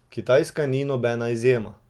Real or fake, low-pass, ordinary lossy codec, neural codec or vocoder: real; 19.8 kHz; Opus, 24 kbps; none